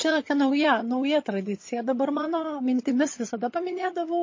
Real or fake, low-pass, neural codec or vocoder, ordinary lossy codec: fake; 7.2 kHz; vocoder, 22.05 kHz, 80 mel bands, HiFi-GAN; MP3, 32 kbps